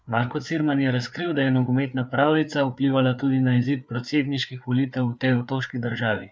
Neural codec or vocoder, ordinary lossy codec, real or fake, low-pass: codec, 16 kHz, 4 kbps, FreqCodec, larger model; none; fake; none